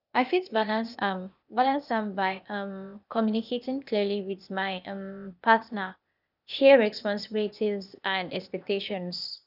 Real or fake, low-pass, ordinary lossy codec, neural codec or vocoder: fake; 5.4 kHz; none; codec, 16 kHz, 0.8 kbps, ZipCodec